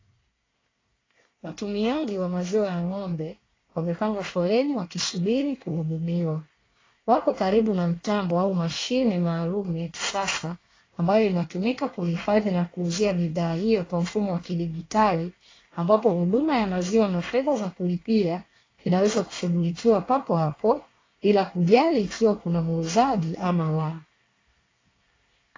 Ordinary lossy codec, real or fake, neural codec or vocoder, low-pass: AAC, 32 kbps; fake; codec, 24 kHz, 1 kbps, SNAC; 7.2 kHz